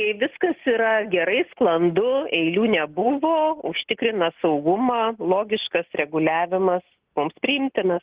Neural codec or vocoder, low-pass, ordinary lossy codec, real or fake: none; 3.6 kHz; Opus, 16 kbps; real